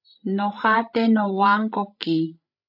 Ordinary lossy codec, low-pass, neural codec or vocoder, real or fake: AAC, 48 kbps; 5.4 kHz; codec, 16 kHz, 16 kbps, FreqCodec, larger model; fake